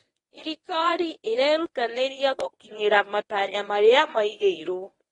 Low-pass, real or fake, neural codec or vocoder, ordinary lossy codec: 10.8 kHz; fake; codec, 24 kHz, 0.9 kbps, WavTokenizer, medium speech release version 1; AAC, 32 kbps